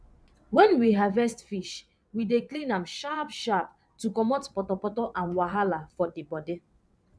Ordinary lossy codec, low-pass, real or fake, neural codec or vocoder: none; none; fake; vocoder, 22.05 kHz, 80 mel bands, WaveNeXt